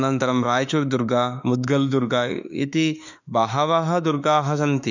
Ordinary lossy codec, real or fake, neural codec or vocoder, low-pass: none; fake; autoencoder, 48 kHz, 32 numbers a frame, DAC-VAE, trained on Japanese speech; 7.2 kHz